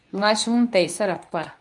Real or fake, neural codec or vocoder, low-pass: fake; codec, 24 kHz, 0.9 kbps, WavTokenizer, medium speech release version 2; 10.8 kHz